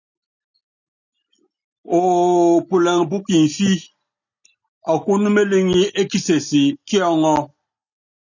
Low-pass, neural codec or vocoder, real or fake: 7.2 kHz; none; real